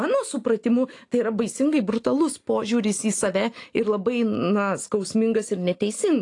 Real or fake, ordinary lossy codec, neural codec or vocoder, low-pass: real; AAC, 48 kbps; none; 10.8 kHz